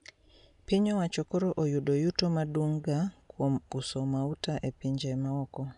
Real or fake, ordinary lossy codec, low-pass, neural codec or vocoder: real; none; 10.8 kHz; none